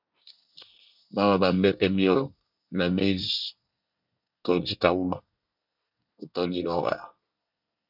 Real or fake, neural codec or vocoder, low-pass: fake; codec, 24 kHz, 1 kbps, SNAC; 5.4 kHz